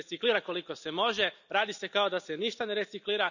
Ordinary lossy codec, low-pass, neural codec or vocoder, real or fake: none; 7.2 kHz; none; real